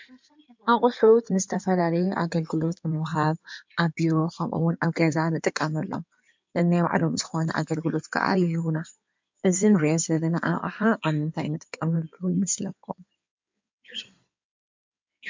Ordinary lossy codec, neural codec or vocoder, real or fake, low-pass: MP3, 64 kbps; codec, 16 kHz in and 24 kHz out, 2.2 kbps, FireRedTTS-2 codec; fake; 7.2 kHz